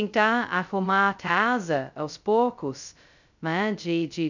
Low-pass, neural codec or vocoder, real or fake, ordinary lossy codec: 7.2 kHz; codec, 16 kHz, 0.2 kbps, FocalCodec; fake; none